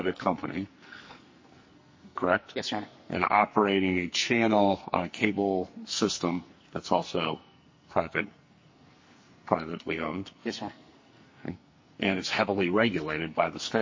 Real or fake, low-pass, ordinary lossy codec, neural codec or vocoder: fake; 7.2 kHz; MP3, 32 kbps; codec, 44.1 kHz, 2.6 kbps, SNAC